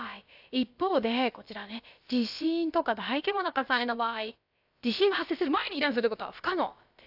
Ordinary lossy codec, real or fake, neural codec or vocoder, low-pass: AAC, 48 kbps; fake; codec, 16 kHz, about 1 kbps, DyCAST, with the encoder's durations; 5.4 kHz